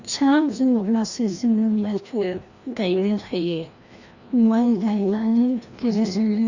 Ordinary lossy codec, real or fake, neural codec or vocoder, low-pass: Opus, 64 kbps; fake; codec, 16 kHz, 1 kbps, FreqCodec, larger model; 7.2 kHz